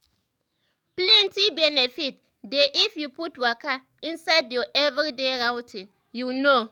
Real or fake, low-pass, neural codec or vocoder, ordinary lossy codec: fake; 19.8 kHz; codec, 44.1 kHz, 7.8 kbps, DAC; none